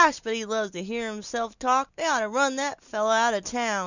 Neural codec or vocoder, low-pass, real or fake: none; 7.2 kHz; real